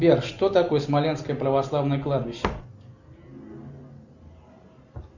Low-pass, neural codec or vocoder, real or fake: 7.2 kHz; none; real